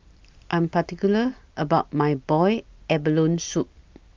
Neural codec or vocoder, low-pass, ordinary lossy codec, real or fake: none; 7.2 kHz; Opus, 32 kbps; real